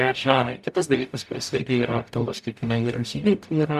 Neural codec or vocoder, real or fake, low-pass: codec, 44.1 kHz, 0.9 kbps, DAC; fake; 14.4 kHz